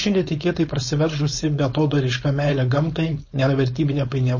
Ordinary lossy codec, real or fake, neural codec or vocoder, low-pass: MP3, 32 kbps; fake; codec, 16 kHz, 4.8 kbps, FACodec; 7.2 kHz